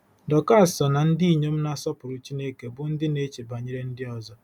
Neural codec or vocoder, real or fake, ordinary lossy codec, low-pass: none; real; none; 19.8 kHz